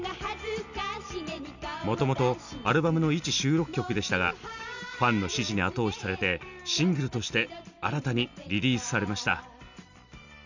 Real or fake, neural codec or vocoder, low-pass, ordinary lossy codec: real; none; 7.2 kHz; none